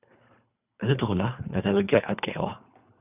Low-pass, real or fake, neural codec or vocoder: 3.6 kHz; fake; codec, 24 kHz, 3 kbps, HILCodec